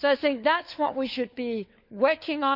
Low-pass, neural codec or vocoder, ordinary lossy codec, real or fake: 5.4 kHz; codec, 16 kHz, 4 kbps, FunCodec, trained on LibriTTS, 50 frames a second; none; fake